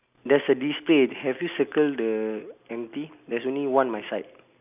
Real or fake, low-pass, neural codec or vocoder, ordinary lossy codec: real; 3.6 kHz; none; none